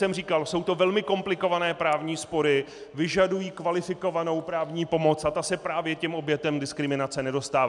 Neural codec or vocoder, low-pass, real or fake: none; 10.8 kHz; real